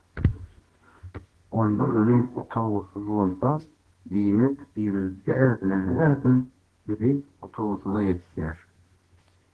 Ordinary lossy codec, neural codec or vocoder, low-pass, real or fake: Opus, 16 kbps; codec, 24 kHz, 0.9 kbps, WavTokenizer, medium music audio release; 10.8 kHz; fake